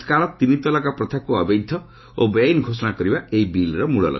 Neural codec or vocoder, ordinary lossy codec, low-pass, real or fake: autoencoder, 48 kHz, 128 numbers a frame, DAC-VAE, trained on Japanese speech; MP3, 24 kbps; 7.2 kHz; fake